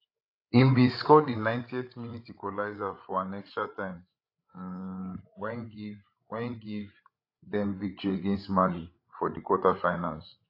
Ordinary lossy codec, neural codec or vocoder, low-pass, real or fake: AAC, 32 kbps; codec, 16 kHz, 8 kbps, FreqCodec, larger model; 5.4 kHz; fake